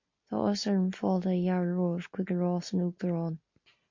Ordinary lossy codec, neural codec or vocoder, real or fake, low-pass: MP3, 48 kbps; none; real; 7.2 kHz